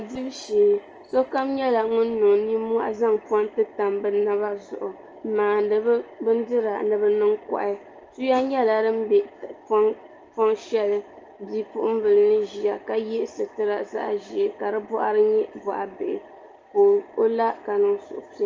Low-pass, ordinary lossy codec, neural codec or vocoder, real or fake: 7.2 kHz; Opus, 24 kbps; none; real